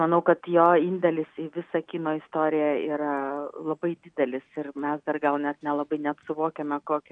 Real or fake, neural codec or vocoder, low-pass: real; none; 9.9 kHz